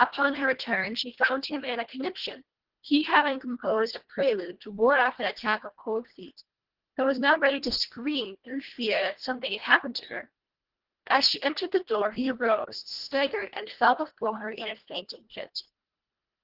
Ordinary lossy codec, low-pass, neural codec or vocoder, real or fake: Opus, 16 kbps; 5.4 kHz; codec, 24 kHz, 1.5 kbps, HILCodec; fake